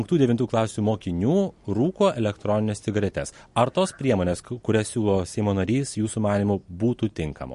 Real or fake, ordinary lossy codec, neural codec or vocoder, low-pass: real; MP3, 48 kbps; none; 14.4 kHz